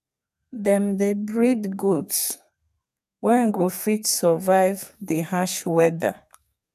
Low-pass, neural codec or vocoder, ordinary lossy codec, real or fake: 14.4 kHz; codec, 32 kHz, 1.9 kbps, SNAC; none; fake